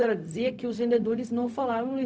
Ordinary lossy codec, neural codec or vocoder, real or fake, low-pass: none; codec, 16 kHz, 0.4 kbps, LongCat-Audio-Codec; fake; none